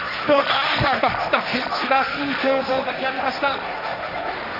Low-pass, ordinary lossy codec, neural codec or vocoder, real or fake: 5.4 kHz; AAC, 48 kbps; codec, 16 kHz, 1.1 kbps, Voila-Tokenizer; fake